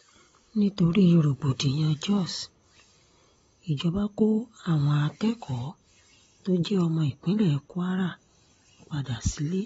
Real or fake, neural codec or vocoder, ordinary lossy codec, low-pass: real; none; AAC, 24 kbps; 10.8 kHz